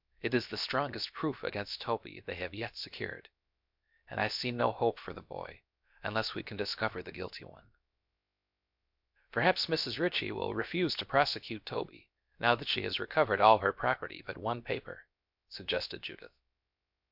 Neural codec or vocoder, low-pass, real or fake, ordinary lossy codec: codec, 16 kHz, about 1 kbps, DyCAST, with the encoder's durations; 5.4 kHz; fake; MP3, 48 kbps